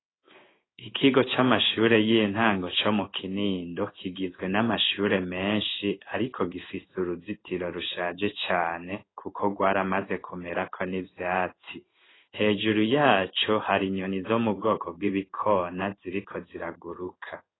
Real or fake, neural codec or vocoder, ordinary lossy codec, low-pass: fake; codec, 16 kHz in and 24 kHz out, 1 kbps, XY-Tokenizer; AAC, 16 kbps; 7.2 kHz